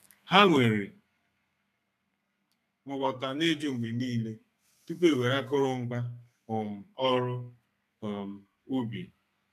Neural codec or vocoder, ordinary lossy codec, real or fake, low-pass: codec, 32 kHz, 1.9 kbps, SNAC; none; fake; 14.4 kHz